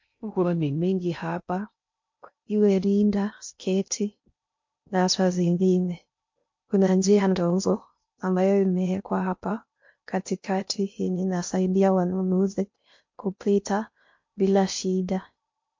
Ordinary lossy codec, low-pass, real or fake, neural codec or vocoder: MP3, 48 kbps; 7.2 kHz; fake; codec, 16 kHz in and 24 kHz out, 0.6 kbps, FocalCodec, streaming, 2048 codes